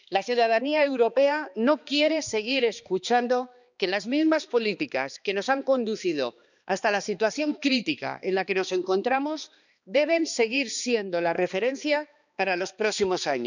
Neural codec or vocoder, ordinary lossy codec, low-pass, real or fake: codec, 16 kHz, 2 kbps, X-Codec, HuBERT features, trained on balanced general audio; none; 7.2 kHz; fake